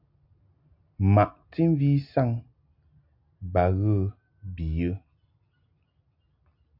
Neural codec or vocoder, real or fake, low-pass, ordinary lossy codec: none; real; 5.4 kHz; MP3, 48 kbps